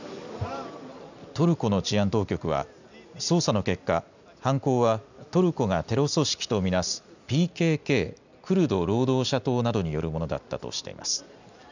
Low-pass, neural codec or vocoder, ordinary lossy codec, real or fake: 7.2 kHz; none; none; real